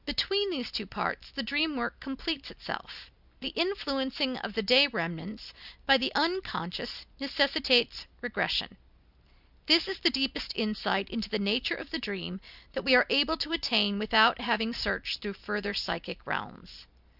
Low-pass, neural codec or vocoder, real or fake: 5.4 kHz; none; real